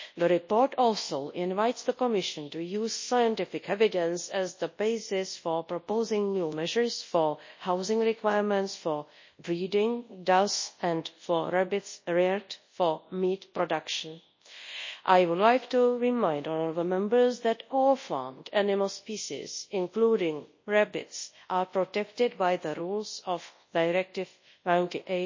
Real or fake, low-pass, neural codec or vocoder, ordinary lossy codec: fake; 7.2 kHz; codec, 24 kHz, 0.9 kbps, WavTokenizer, large speech release; MP3, 32 kbps